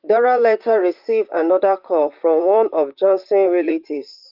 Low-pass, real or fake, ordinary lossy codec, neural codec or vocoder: 5.4 kHz; fake; Opus, 32 kbps; vocoder, 44.1 kHz, 128 mel bands, Pupu-Vocoder